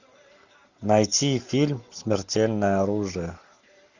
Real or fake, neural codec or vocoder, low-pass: real; none; 7.2 kHz